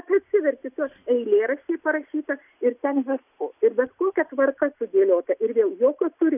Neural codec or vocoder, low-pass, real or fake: none; 3.6 kHz; real